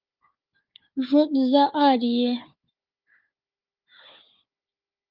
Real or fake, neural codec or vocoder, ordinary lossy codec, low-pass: fake; codec, 16 kHz, 4 kbps, FunCodec, trained on Chinese and English, 50 frames a second; Opus, 24 kbps; 5.4 kHz